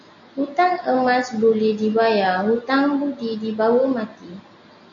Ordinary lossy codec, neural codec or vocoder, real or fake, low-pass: AAC, 64 kbps; none; real; 7.2 kHz